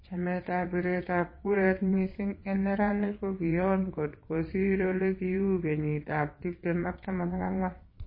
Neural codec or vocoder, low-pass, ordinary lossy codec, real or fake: vocoder, 22.05 kHz, 80 mel bands, WaveNeXt; 5.4 kHz; MP3, 24 kbps; fake